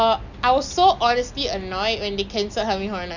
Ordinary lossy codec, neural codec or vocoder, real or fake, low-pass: none; none; real; 7.2 kHz